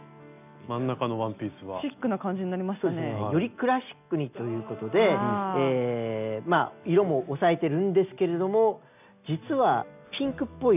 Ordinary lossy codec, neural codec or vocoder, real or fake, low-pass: none; none; real; 3.6 kHz